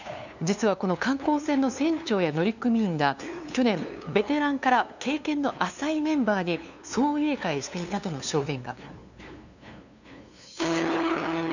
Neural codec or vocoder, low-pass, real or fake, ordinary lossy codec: codec, 16 kHz, 2 kbps, FunCodec, trained on LibriTTS, 25 frames a second; 7.2 kHz; fake; none